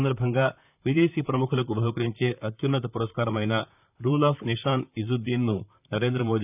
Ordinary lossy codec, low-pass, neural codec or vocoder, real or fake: none; 3.6 kHz; vocoder, 44.1 kHz, 128 mel bands, Pupu-Vocoder; fake